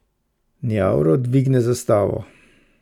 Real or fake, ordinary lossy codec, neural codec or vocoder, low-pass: real; none; none; 19.8 kHz